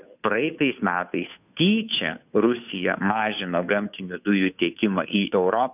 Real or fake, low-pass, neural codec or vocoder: fake; 3.6 kHz; codec, 16 kHz, 2 kbps, FunCodec, trained on Chinese and English, 25 frames a second